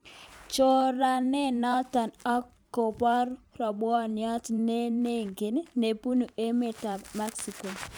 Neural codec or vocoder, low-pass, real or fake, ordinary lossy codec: vocoder, 44.1 kHz, 128 mel bands every 512 samples, BigVGAN v2; none; fake; none